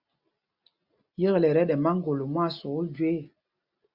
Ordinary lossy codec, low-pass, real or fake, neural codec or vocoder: Opus, 64 kbps; 5.4 kHz; real; none